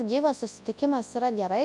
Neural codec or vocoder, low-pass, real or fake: codec, 24 kHz, 0.9 kbps, WavTokenizer, large speech release; 10.8 kHz; fake